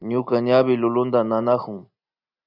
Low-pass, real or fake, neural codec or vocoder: 5.4 kHz; real; none